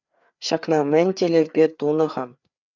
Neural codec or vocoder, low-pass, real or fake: codec, 16 kHz, 4 kbps, FreqCodec, larger model; 7.2 kHz; fake